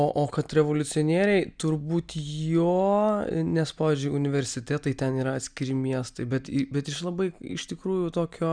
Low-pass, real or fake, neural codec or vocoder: 9.9 kHz; real; none